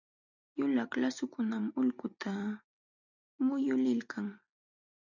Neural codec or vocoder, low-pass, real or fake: none; 7.2 kHz; real